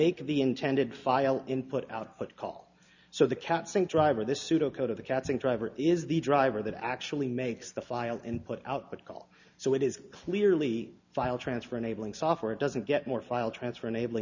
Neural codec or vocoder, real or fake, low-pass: none; real; 7.2 kHz